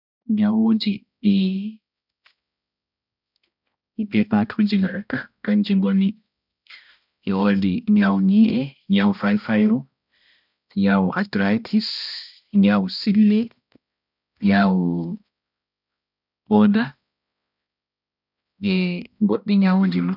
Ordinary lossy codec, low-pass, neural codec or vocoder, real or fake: none; 5.4 kHz; codec, 16 kHz, 1 kbps, X-Codec, HuBERT features, trained on balanced general audio; fake